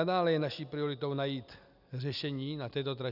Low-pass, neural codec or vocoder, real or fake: 5.4 kHz; none; real